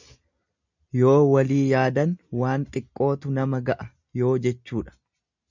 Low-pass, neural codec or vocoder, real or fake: 7.2 kHz; none; real